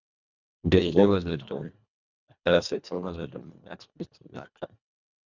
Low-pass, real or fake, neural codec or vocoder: 7.2 kHz; fake; codec, 24 kHz, 1.5 kbps, HILCodec